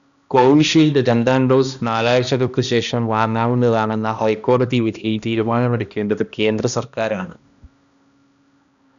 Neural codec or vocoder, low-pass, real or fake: codec, 16 kHz, 1 kbps, X-Codec, HuBERT features, trained on balanced general audio; 7.2 kHz; fake